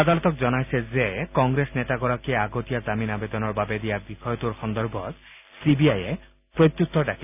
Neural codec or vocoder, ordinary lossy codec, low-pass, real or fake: none; none; 3.6 kHz; real